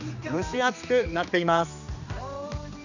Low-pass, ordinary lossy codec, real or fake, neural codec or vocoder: 7.2 kHz; none; fake; codec, 16 kHz, 2 kbps, X-Codec, HuBERT features, trained on general audio